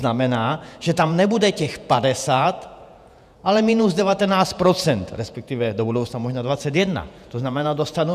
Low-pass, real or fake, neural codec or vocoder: 14.4 kHz; fake; vocoder, 48 kHz, 128 mel bands, Vocos